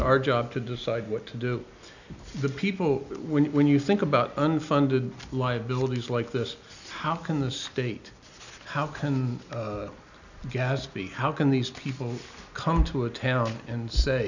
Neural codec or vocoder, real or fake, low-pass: none; real; 7.2 kHz